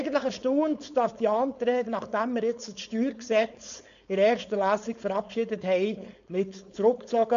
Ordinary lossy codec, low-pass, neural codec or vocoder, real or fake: none; 7.2 kHz; codec, 16 kHz, 4.8 kbps, FACodec; fake